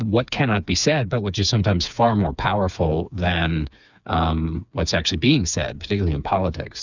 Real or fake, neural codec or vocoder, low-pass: fake; codec, 16 kHz, 4 kbps, FreqCodec, smaller model; 7.2 kHz